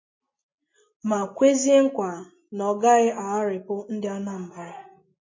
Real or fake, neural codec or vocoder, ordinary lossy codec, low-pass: real; none; MP3, 32 kbps; 7.2 kHz